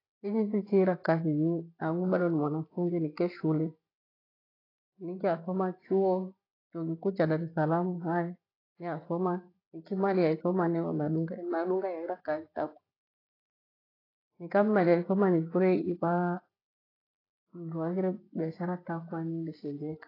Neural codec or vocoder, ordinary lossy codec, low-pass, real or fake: none; AAC, 24 kbps; 5.4 kHz; real